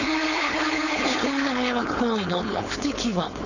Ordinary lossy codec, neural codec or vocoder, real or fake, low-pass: none; codec, 16 kHz, 4.8 kbps, FACodec; fake; 7.2 kHz